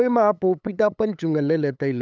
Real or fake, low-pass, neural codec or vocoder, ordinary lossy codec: fake; none; codec, 16 kHz, 8 kbps, FunCodec, trained on LibriTTS, 25 frames a second; none